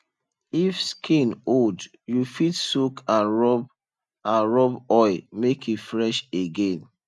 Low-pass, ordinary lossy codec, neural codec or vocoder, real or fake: none; none; none; real